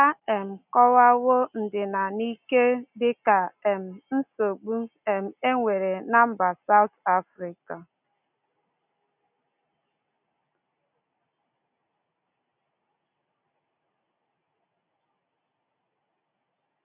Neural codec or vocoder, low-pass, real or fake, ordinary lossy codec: none; 3.6 kHz; real; none